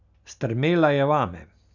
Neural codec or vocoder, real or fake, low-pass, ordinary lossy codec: none; real; 7.2 kHz; none